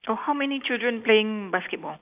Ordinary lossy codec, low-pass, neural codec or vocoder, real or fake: AAC, 32 kbps; 3.6 kHz; none; real